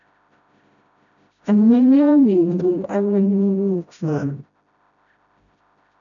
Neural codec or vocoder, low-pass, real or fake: codec, 16 kHz, 0.5 kbps, FreqCodec, smaller model; 7.2 kHz; fake